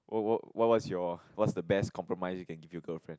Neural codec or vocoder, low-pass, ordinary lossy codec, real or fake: none; none; none; real